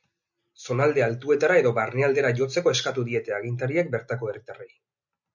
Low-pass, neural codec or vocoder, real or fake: 7.2 kHz; none; real